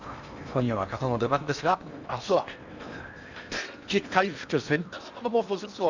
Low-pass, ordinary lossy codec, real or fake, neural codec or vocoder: 7.2 kHz; none; fake; codec, 16 kHz in and 24 kHz out, 0.8 kbps, FocalCodec, streaming, 65536 codes